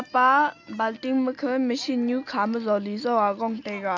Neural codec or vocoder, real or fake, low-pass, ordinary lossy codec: none; real; 7.2 kHz; MP3, 64 kbps